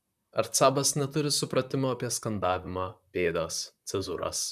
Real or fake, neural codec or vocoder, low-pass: fake; vocoder, 44.1 kHz, 128 mel bands, Pupu-Vocoder; 14.4 kHz